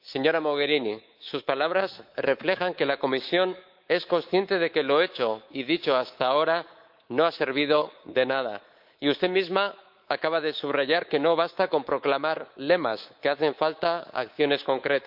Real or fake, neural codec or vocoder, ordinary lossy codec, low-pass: fake; codec, 24 kHz, 3.1 kbps, DualCodec; Opus, 24 kbps; 5.4 kHz